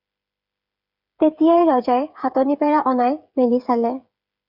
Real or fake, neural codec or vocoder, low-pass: fake; codec, 16 kHz, 8 kbps, FreqCodec, smaller model; 5.4 kHz